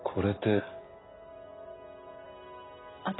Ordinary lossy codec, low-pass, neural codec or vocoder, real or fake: AAC, 16 kbps; 7.2 kHz; none; real